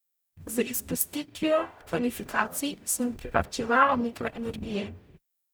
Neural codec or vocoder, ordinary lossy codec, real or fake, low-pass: codec, 44.1 kHz, 0.9 kbps, DAC; none; fake; none